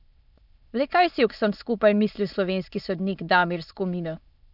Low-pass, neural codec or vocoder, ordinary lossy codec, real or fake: 5.4 kHz; autoencoder, 22.05 kHz, a latent of 192 numbers a frame, VITS, trained on many speakers; none; fake